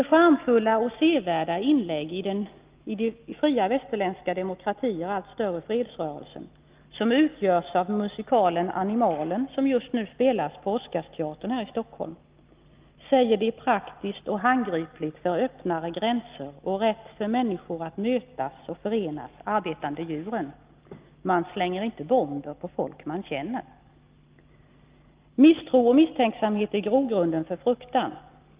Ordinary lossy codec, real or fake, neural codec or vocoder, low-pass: Opus, 32 kbps; real; none; 3.6 kHz